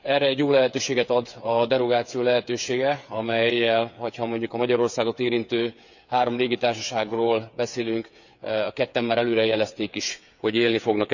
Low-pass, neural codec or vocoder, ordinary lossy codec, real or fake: 7.2 kHz; codec, 16 kHz, 8 kbps, FreqCodec, smaller model; none; fake